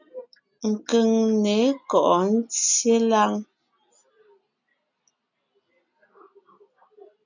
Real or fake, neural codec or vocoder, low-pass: real; none; 7.2 kHz